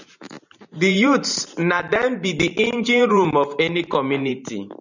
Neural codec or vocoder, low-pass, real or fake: vocoder, 44.1 kHz, 128 mel bands every 512 samples, BigVGAN v2; 7.2 kHz; fake